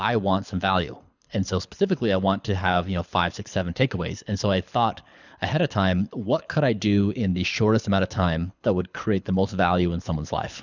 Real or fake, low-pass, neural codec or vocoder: fake; 7.2 kHz; codec, 24 kHz, 6 kbps, HILCodec